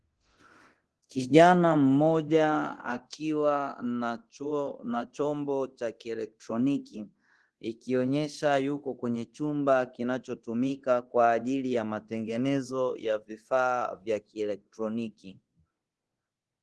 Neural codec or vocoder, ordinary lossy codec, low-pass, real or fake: codec, 24 kHz, 0.9 kbps, DualCodec; Opus, 16 kbps; 10.8 kHz; fake